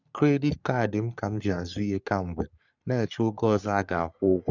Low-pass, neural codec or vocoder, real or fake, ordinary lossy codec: 7.2 kHz; codec, 44.1 kHz, 3.4 kbps, Pupu-Codec; fake; none